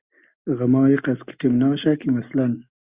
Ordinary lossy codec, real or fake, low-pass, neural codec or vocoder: Opus, 64 kbps; real; 3.6 kHz; none